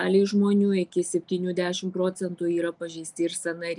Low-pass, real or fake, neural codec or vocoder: 10.8 kHz; real; none